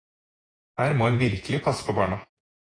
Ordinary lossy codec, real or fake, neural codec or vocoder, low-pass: AAC, 32 kbps; fake; vocoder, 48 kHz, 128 mel bands, Vocos; 9.9 kHz